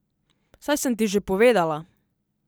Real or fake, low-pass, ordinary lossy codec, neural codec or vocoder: real; none; none; none